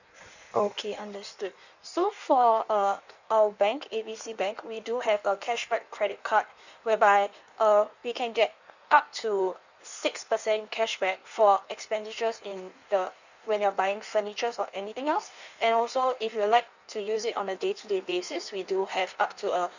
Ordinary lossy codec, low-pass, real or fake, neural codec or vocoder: none; 7.2 kHz; fake; codec, 16 kHz in and 24 kHz out, 1.1 kbps, FireRedTTS-2 codec